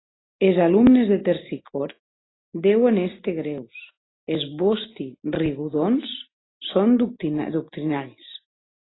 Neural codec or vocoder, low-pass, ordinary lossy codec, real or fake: none; 7.2 kHz; AAC, 16 kbps; real